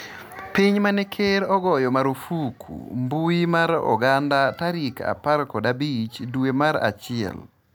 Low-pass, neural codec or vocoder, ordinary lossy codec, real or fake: none; none; none; real